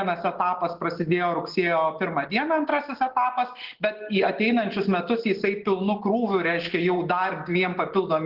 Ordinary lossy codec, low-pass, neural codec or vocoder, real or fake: Opus, 16 kbps; 5.4 kHz; none; real